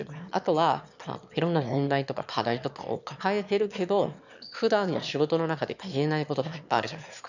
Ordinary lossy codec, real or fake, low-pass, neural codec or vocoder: none; fake; 7.2 kHz; autoencoder, 22.05 kHz, a latent of 192 numbers a frame, VITS, trained on one speaker